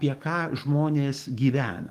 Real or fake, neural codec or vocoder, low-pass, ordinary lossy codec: fake; codec, 44.1 kHz, 7.8 kbps, DAC; 14.4 kHz; Opus, 24 kbps